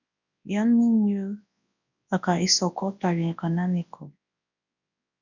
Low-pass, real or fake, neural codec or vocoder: 7.2 kHz; fake; codec, 24 kHz, 0.9 kbps, WavTokenizer, large speech release